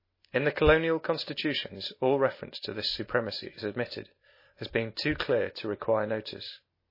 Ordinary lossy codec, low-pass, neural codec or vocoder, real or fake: MP3, 24 kbps; 5.4 kHz; none; real